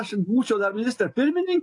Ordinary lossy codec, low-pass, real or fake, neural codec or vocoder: AAC, 48 kbps; 10.8 kHz; real; none